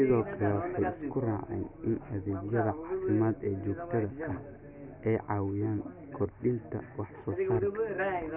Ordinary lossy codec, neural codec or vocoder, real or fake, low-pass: none; none; real; 3.6 kHz